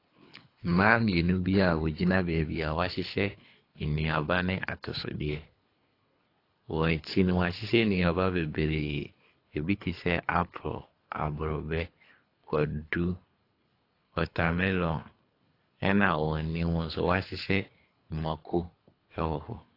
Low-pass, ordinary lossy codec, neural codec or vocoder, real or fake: 5.4 kHz; AAC, 32 kbps; codec, 24 kHz, 3 kbps, HILCodec; fake